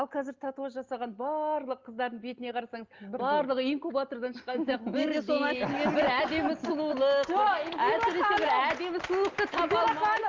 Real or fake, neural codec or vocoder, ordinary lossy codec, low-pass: real; none; Opus, 32 kbps; 7.2 kHz